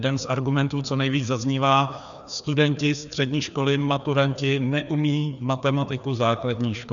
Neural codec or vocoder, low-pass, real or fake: codec, 16 kHz, 2 kbps, FreqCodec, larger model; 7.2 kHz; fake